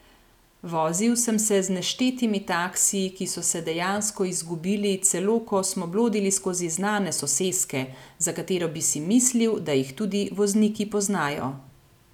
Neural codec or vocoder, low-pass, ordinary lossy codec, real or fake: none; 19.8 kHz; none; real